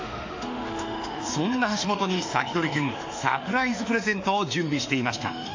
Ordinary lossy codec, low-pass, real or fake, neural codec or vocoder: AAC, 48 kbps; 7.2 kHz; fake; autoencoder, 48 kHz, 32 numbers a frame, DAC-VAE, trained on Japanese speech